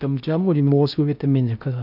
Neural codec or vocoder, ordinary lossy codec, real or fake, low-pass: codec, 16 kHz in and 24 kHz out, 0.6 kbps, FocalCodec, streaming, 4096 codes; none; fake; 5.4 kHz